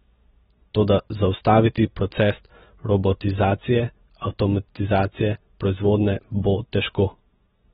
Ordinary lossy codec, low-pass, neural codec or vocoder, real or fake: AAC, 16 kbps; 7.2 kHz; none; real